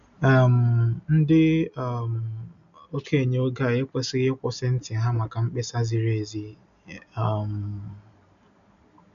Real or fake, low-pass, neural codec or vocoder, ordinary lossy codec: real; 7.2 kHz; none; none